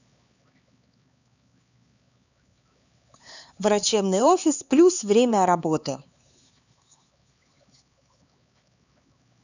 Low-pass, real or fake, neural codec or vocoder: 7.2 kHz; fake; codec, 16 kHz, 4 kbps, X-Codec, HuBERT features, trained on LibriSpeech